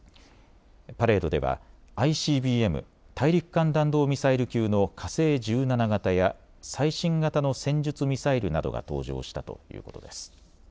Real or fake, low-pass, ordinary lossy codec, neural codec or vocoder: real; none; none; none